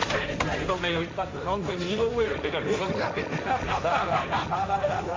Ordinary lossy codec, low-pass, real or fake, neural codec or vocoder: MP3, 48 kbps; 7.2 kHz; fake; codec, 16 kHz, 1.1 kbps, Voila-Tokenizer